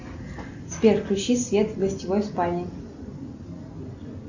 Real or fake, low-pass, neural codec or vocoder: real; 7.2 kHz; none